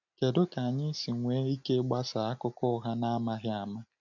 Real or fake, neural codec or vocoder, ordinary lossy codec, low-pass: real; none; none; 7.2 kHz